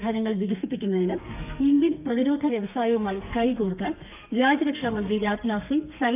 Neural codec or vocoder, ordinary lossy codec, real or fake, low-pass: codec, 32 kHz, 1.9 kbps, SNAC; none; fake; 3.6 kHz